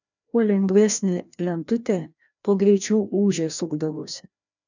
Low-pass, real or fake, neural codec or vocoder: 7.2 kHz; fake; codec, 16 kHz, 1 kbps, FreqCodec, larger model